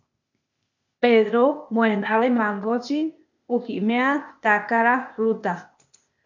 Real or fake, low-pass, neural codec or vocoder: fake; 7.2 kHz; codec, 16 kHz, 0.8 kbps, ZipCodec